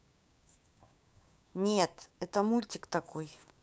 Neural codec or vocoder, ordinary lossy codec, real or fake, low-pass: codec, 16 kHz, 6 kbps, DAC; none; fake; none